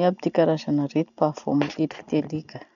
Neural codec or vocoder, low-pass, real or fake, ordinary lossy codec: none; 7.2 kHz; real; none